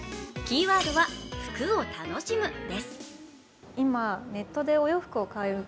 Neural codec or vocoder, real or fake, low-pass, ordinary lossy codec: none; real; none; none